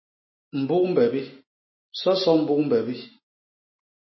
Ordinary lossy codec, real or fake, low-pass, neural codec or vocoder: MP3, 24 kbps; real; 7.2 kHz; none